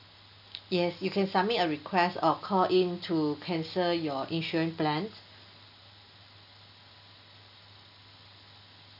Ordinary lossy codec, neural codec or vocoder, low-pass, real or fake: none; none; 5.4 kHz; real